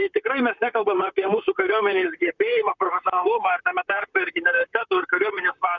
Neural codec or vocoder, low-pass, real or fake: vocoder, 44.1 kHz, 128 mel bands, Pupu-Vocoder; 7.2 kHz; fake